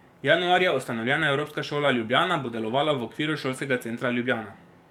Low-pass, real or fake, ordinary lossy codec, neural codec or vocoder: 19.8 kHz; fake; none; codec, 44.1 kHz, 7.8 kbps, DAC